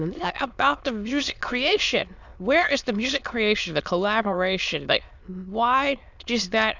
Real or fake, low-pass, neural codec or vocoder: fake; 7.2 kHz; autoencoder, 22.05 kHz, a latent of 192 numbers a frame, VITS, trained on many speakers